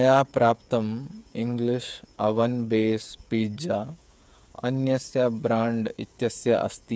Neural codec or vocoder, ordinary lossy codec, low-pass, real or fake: codec, 16 kHz, 8 kbps, FreqCodec, smaller model; none; none; fake